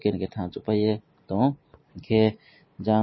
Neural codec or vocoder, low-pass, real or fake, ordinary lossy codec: none; 7.2 kHz; real; MP3, 24 kbps